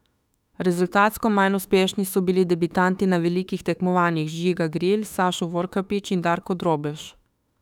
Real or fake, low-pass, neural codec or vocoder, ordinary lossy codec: fake; 19.8 kHz; autoencoder, 48 kHz, 32 numbers a frame, DAC-VAE, trained on Japanese speech; none